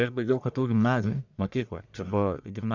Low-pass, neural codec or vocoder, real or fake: 7.2 kHz; codec, 44.1 kHz, 1.7 kbps, Pupu-Codec; fake